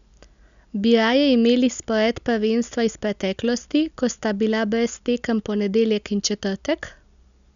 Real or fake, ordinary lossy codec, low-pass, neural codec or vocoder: real; none; 7.2 kHz; none